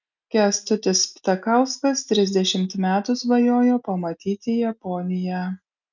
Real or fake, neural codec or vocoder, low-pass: real; none; 7.2 kHz